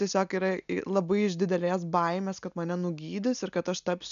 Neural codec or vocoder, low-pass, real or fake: none; 7.2 kHz; real